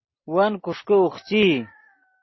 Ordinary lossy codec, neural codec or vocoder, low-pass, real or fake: MP3, 24 kbps; none; 7.2 kHz; real